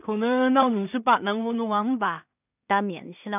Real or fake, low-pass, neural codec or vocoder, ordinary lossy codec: fake; 3.6 kHz; codec, 16 kHz in and 24 kHz out, 0.4 kbps, LongCat-Audio-Codec, two codebook decoder; none